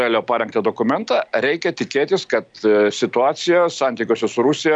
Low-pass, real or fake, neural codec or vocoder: 9.9 kHz; real; none